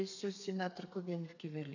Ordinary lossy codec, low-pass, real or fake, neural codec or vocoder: none; 7.2 kHz; fake; codec, 32 kHz, 1.9 kbps, SNAC